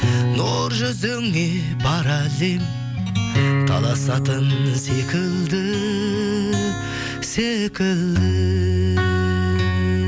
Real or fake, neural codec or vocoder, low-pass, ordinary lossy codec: real; none; none; none